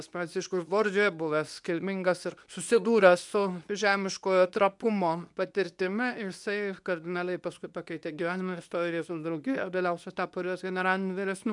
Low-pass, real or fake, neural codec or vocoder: 10.8 kHz; fake; codec, 24 kHz, 0.9 kbps, WavTokenizer, medium speech release version 2